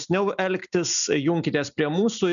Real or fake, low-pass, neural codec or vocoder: real; 7.2 kHz; none